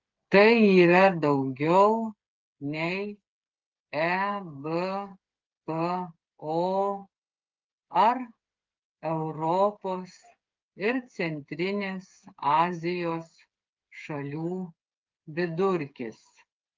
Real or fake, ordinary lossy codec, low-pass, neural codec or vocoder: fake; Opus, 32 kbps; 7.2 kHz; codec, 16 kHz, 8 kbps, FreqCodec, smaller model